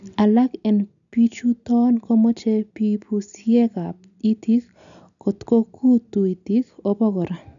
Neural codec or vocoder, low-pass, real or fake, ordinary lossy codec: none; 7.2 kHz; real; none